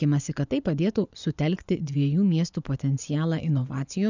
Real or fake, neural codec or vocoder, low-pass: fake; vocoder, 24 kHz, 100 mel bands, Vocos; 7.2 kHz